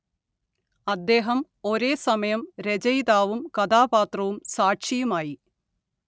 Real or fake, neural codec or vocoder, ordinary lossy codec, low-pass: real; none; none; none